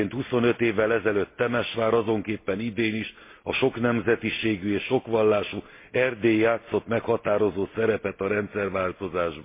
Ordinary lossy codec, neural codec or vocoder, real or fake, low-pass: MP3, 24 kbps; none; real; 3.6 kHz